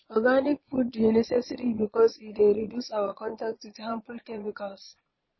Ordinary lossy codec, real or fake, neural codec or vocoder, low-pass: MP3, 24 kbps; fake; vocoder, 22.05 kHz, 80 mel bands, Vocos; 7.2 kHz